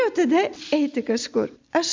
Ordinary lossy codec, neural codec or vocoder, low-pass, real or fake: MP3, 64 kbps; none; 7.2 kHz; real